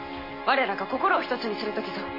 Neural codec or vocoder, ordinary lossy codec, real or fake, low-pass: none; MP3, 24 kbps; real; 5.4 kHz